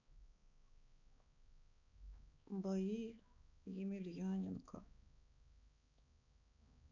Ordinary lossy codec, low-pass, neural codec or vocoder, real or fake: none; 7.2 kHz; codec, 16 kHz, 4 kbps, X-Codec, HuBERT features, trained on balanced general audio; fake